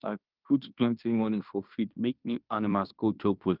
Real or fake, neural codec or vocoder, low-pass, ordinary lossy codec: fake; codec, 16 kHz in and 24 kHz out, 0.9 kbps, LongCat-Audio-Codec, fine tuned four codebook decoder; 5.4 kHz; Opus, 24 kbps